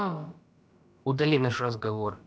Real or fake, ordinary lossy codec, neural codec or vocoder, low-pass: fake; none; codec, 16 kHz, about 1 kbps, DyCAST, with the encoder's durations; none